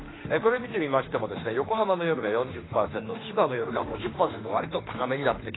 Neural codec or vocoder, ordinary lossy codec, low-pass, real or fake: autoencoder, 48 kHz, 32 numbers a frame, DAC-VAE, trained on Japanese speech; AAC, 16 kbps; 7.2 kHz; fake